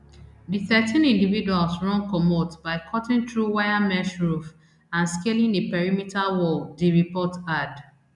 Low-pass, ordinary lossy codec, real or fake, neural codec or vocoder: 10.8 kHz; none; real; none